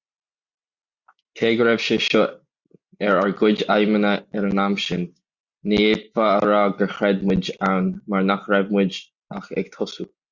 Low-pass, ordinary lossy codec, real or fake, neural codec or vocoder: 7.2 kHz; Opus, 64 kbps; real; none